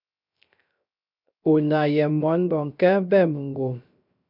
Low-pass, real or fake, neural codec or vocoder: 5.4 kHz; fake; codec, 16 kHz, 0.3 kbps, FocalCodec